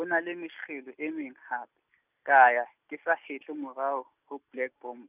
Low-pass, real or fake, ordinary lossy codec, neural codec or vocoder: 3.6 kHz; real; none; none